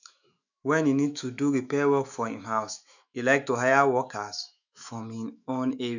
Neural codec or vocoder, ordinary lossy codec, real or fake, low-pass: autoencoder, 48 kHz, 128 numbers a frame, DAC-VAE, trained on Japanese speech; none; fake; 7.2 kHz